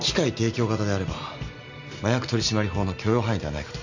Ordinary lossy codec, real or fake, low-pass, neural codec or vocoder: none; real; 7.2 kHz; none